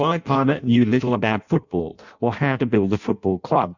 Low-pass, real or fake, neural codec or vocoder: 7.2 kHz; fake; codec, 16 kHz in and 24 kHz out, 0.6 kbps, FireRedTTS-2 codec